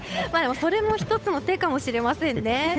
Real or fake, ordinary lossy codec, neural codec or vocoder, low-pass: fake; none; codec, 16 kHz, 8 kbps, FunCodec, trained on Chinese and English, 25 frames a second; none